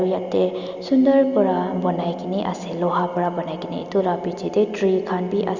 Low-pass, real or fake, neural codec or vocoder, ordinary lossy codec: 7.2 kHz; real; none; Opus, 64 kbps